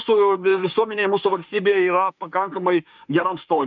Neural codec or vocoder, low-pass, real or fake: autoencoder, 48 kHz, 32 numbers a frame, DAC-VAE, trained on Japanese speech; 7.2 kHz; fake